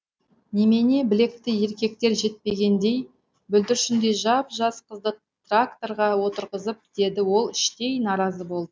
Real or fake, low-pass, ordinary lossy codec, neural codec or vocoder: real; none; none; none